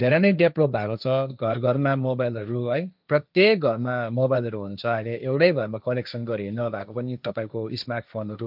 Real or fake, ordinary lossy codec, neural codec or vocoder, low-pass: fake; none; codec, 16 kHz, 1.1 kbps, Voila-Tokenizer; 5.4 kHz